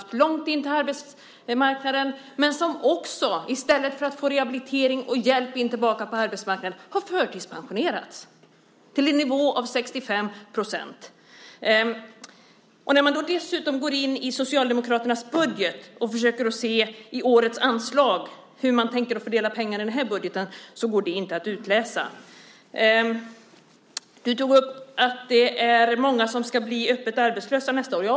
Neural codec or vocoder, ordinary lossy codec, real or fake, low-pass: none; none; real; none